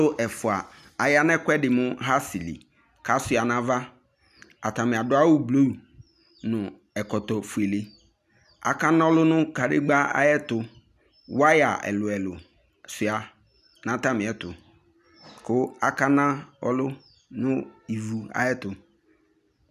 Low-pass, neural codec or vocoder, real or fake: 14.4 kHz; none; real